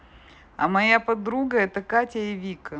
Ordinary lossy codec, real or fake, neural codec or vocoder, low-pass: none; real; none; none